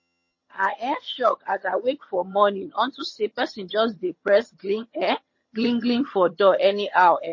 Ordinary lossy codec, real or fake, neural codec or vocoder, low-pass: MP3, 32 kbps; fake; vocoder, 22.05 kHz, 80 mel bands, HiFi-GAN; 7.2 kHz